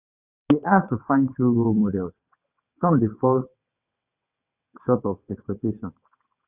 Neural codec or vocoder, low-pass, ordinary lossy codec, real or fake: vocoder, 22.05 kHz, 80 mel bands, WaveNeXt; 3.6 kHz; none; fake